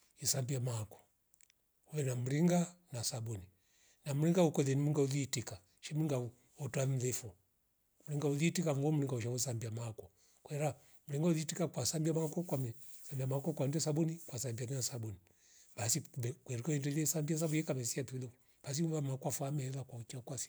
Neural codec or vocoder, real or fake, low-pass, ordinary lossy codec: none; real; none; none